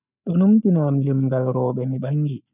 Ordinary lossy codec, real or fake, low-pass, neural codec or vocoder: none; real; 3.6 kHz; none